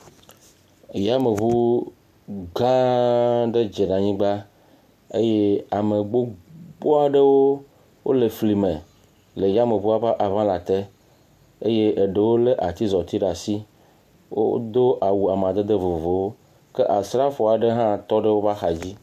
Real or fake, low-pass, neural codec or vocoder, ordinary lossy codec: real; 14.4 kHz; none; MP3, 96 kbps